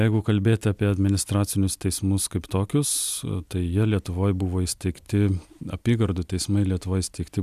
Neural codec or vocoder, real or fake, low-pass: none; real; 14.4 kHz